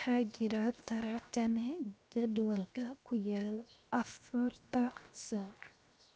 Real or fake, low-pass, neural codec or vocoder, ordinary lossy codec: fake; none; codec, 16 kHz, 0.7 kbps, FocalCodec; none